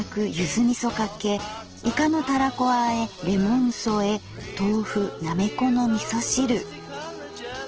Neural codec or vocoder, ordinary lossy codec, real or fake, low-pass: none; Opus, 16 kbps; real; 7.2 kHz